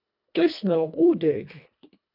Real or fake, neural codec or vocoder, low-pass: fake; codec, 24 kHz, 1.5 kbps, HILCodec; 5.4 kHz